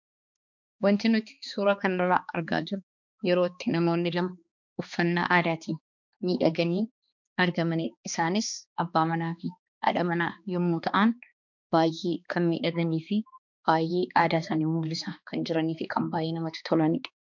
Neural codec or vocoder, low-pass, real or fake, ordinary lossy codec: codec, 16 kHz, 2 kbps, X-Codec, HuBERT features, trained on balanced general audio; 7.2 kHz; fake; MP3, 64 kbps